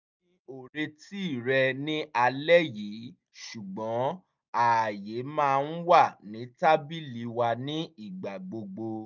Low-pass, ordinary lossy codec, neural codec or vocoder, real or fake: 7.2 kHz; none; none; real